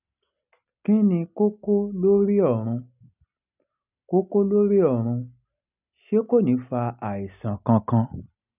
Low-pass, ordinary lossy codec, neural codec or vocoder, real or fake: 3.6 kHz; none; none; real